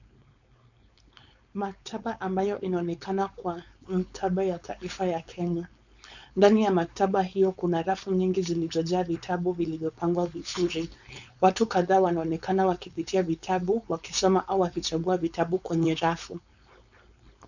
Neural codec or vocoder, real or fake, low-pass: codec, 16 kHz, 4.8 kbps, FACodec; fake; 7.2 kHz